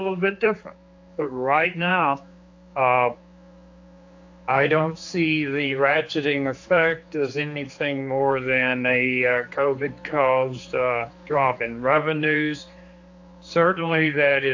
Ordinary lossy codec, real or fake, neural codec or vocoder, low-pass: AAC, 48 kbps; fake; codec, 16 kHz, 4 kbps, X-Codec, HuBERT features, trained on general audio; 7.2 kHz